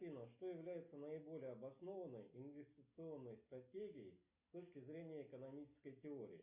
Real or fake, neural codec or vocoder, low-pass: real; none; 3.6 kHz